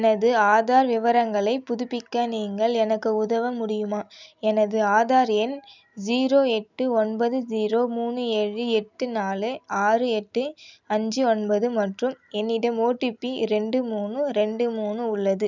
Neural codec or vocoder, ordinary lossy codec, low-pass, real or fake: none; none; 7.2 kHz; real